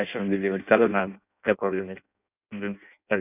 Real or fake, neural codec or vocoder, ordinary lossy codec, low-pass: fake; codec, 16 kHz in and 24 kHz out, 0.6 kbps, FireRedTTS-2 codec; none; 3.6 kHz